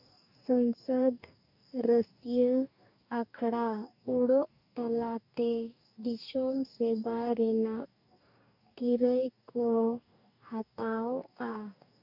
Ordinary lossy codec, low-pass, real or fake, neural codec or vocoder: none; 5.4 kHz; fake; codec, 44.1 kHz, 2.6 kbps, DAC